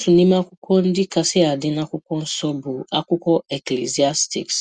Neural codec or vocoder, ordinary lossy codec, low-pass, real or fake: none; Opus, 64 kbps; 9.9 kHz; real